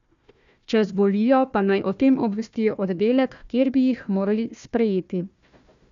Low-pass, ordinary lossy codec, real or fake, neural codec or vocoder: 7.2 kHz; MP3, 96 kbps; fake; codec, 16 kHz, 1 kbps, FunCodec, trained on Chinese and English, 50 frames a second